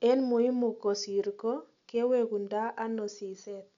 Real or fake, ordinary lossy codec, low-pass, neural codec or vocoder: real; none; 7.2 kHz; none